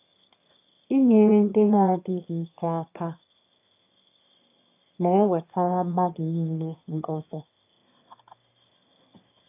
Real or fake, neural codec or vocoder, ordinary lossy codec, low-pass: fake; autoencoder, 22.05 kHz, a latent of 192 numbers a frame, VITS, trained on one speaker; AAC, 24 kbps; 3.6 kHz